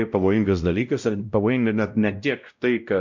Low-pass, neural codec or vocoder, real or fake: 7.2 kHz; codec, 16 kHz, 0.5 kbps, X-Codec, WavLM features, trained on Multilingual LibriSpeech; fake